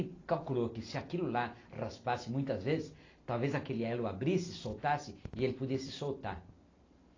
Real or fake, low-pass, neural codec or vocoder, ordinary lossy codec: real; 7.2 kHz; none; AAC, 32 kbps